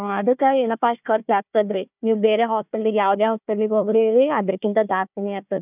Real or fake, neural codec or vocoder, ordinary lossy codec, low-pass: fake; codec, 16 kHz, 1 kbps, FunCodec, trained on Chinese and English, 50 frames a second; none; 3.6 kHz